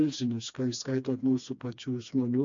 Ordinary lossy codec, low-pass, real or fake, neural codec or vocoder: MP3, 64 kbps; 7.2 kHz; fake; codec, 16 kHz, 2 kbps, FreqCodec, smaller model